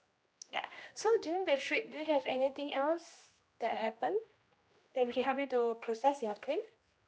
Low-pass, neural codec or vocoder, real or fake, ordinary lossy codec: none; codec, 16 kHz, 1 kbps, X-Codec, HuBERT features, trained on general audio; fake; none